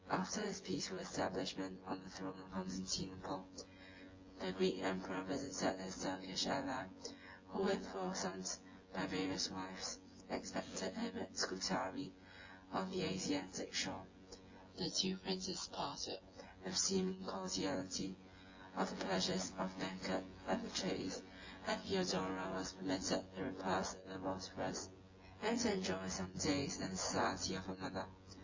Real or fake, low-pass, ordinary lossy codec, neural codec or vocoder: fake; 7.2 kHz; Opus, 32 kbps; vocoder, 24 kHz, 100 mel bands, Vocos